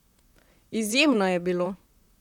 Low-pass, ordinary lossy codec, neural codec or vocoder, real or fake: 19.8 kHz; none; vocoder, 44.1 kHz, 128 mel bands, Pupu-Vocoder; fake